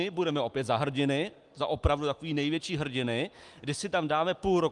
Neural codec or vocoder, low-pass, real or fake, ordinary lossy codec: none; 10.8 kHz; real; Opus, 32 kbps